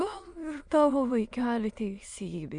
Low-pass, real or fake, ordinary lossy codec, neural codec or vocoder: 9.9 kHz; fake; Opus, 64 kbps; autoencoder, 22.05 kHz, a latent of 192 numbers a frame, VITS, trained on many speakers